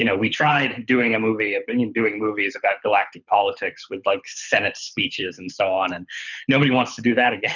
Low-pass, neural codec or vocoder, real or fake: 7.2 kHz; codec, 24 kHz, 6 kbps, HILCodec; fake